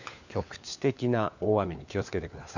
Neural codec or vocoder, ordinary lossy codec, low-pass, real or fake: codec, 16 kHz, 4 kbps, FunCodec, trained on LibriTTS, 50 frames a second; none; 7.2 kHz; fake